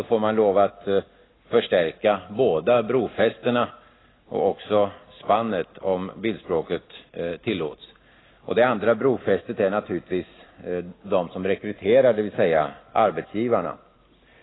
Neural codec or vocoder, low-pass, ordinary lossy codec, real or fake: none; 7.2 kHz; AAC, 16 kbps; real